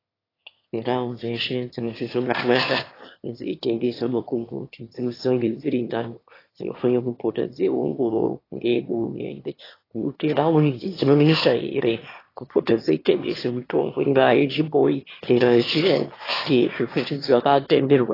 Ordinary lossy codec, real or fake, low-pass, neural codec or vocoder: AAC, 24 kbps; fake; 5.4 kHz; autoencoder, 22.05 kHz, a latent of 192 numbers a frame, VITS, trained on one speaker